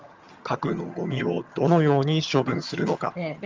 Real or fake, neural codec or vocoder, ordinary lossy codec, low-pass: fake; vocoder, 22.05 kHz, 80 mel bands, HiFi-GAN; Opus, 32 kbps; 7.2 kHz